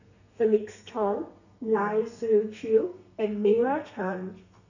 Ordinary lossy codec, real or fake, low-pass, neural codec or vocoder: none; fake; 7.2 kHz; codec, 32 kHz, 1.9 kbps, SNAC